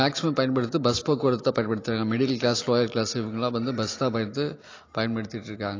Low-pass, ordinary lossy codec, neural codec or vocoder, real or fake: 7.2 kHz; AAC, 32 kbps; none; real